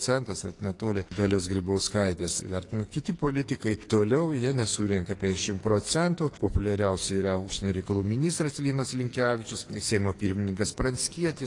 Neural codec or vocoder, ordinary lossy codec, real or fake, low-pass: codec, 44.1 kHz, 2.6 kbps, SNAC; AAC, 48 kbps; fake; 10.8 kHz